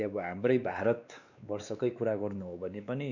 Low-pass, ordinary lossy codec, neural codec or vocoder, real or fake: 7.2 kHz; none; codec, 16 kHz, 2 kbps, X-Codec, WavLM features, trained on Multilingual LibriSpeech; fake